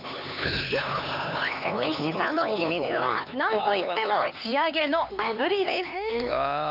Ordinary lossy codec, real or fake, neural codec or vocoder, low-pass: none; fake; codec, 16 kHz, 4 kbps, X-Codec, HuBERT features, trained on LibriSpeech; 5.4 kHz